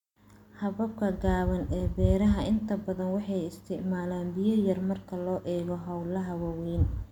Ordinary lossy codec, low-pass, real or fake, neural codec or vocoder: MP3, 96 kbps; 19.8 kHz; real; none